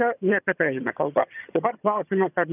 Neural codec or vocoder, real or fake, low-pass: vocoder, 22.05 kHz, 80 mel bands, HiFi-GAN; fake; 3.6 kHz